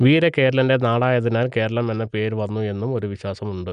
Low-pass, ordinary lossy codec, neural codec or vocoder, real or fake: 9.9 kHz; none; none; real